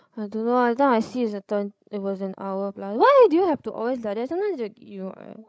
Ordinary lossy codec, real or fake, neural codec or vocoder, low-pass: none; fake; codec, 16 kHz, 16 kbps, FreqCodec, larger model; none